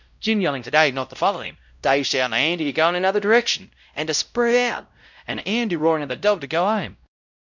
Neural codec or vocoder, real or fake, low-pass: codec, 16 kHz, 0.5 kbps, X-Codec, WavLM features, trained on Multilingual LibriSpeech; fake; 7.2 kHz